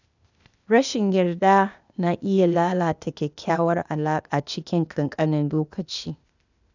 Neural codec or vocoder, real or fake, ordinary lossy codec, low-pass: codec, 16 kHz, 0.8 kbps, ZipCodec; fake; none; 7.2 kHz